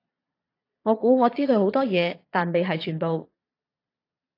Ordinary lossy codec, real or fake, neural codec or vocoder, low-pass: AAC, 32 kbps; fake; vocoder, 22.05 kHz, 80 mel bands, Vocos; 5.4 kHz